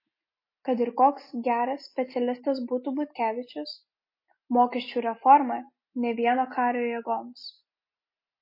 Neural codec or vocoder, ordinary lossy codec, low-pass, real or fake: none; MP3, 24 kbps; 5.4 kHz; real